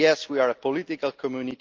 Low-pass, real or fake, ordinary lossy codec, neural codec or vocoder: 7.2 kHz; real; Opus, 24 kbps; none